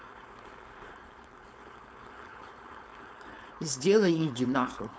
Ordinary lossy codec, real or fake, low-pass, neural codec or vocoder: none; fake; none; codec, 16 kHz, 4.8 kbps, FACodec